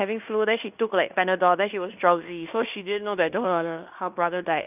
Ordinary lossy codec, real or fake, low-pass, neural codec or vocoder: none; fake; 3.6 kHz; codec, 16 kHz in and 24 kHz out, 0.9 kbps, LongCat-Audio-Codec, fine tuned four codebook decoder